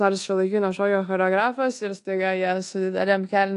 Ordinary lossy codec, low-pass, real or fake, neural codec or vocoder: AAC, 48 kbps; 10.8 kHz; fake; codec, 24 kHz, 1.2 kbps, DualCodec